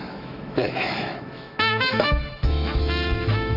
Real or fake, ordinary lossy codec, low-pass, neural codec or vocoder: fake; none; 5.4 kHz; autoencoder, 48 kHz, 128 numbers a frame, DAC-VAE, trained on Japanese speech